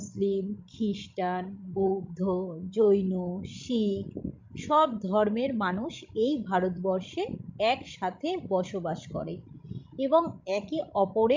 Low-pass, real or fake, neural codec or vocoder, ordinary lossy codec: 7.2 kHz; fake; codec, 16 kHz, 16 kbps, FreqCodec, larger model; MP3, 64 kbps